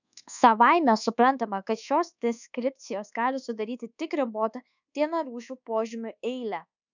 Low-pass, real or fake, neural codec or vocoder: 7.2 kHz; fake; codec, 24 kHz, 1.2 kbps, DualCodec